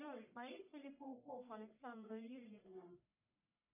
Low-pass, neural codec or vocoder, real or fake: 3.6 kHz; codec, 44.1 kHz, 1.7 kbps, Pupu-Codec; fake